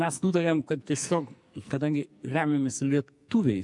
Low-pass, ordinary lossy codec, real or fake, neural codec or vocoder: 10.8 kHz; MP3, 96 kbps; fake; codec, 44.1 kHz, 2.6 kbps, SNAC